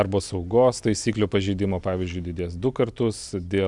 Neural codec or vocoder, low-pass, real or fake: none; 10.8 kHz; real